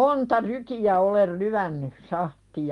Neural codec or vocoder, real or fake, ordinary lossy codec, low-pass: none; real; Opus, 32 kbps; 10.8 kHz